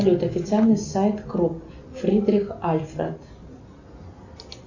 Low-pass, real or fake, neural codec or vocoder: 7.2 kHz; real; none